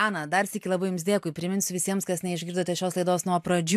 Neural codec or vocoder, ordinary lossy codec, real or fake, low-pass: none; AAC, 96 kbps; real; 14.4 kHz